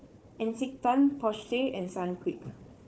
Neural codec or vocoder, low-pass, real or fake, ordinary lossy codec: codec, 16 kHz, 4 kbps, FunCodec, trained on Chinese and English, 50 frames a second; none; fake; none